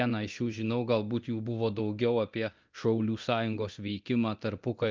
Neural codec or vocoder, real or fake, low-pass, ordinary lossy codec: codec, 24 kHz, 0.9 kbps, DualCodec; fake; 7.2 kHz; Opus, 24 kbps